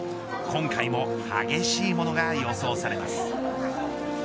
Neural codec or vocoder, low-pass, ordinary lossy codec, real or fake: none; none; none; real